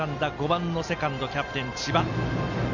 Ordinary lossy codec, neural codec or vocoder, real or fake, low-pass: none; none; real; 7.2 kHz